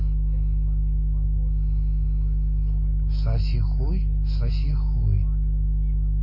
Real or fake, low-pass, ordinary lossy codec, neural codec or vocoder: real; 5.4 kHz; MP3, 24 kbps; none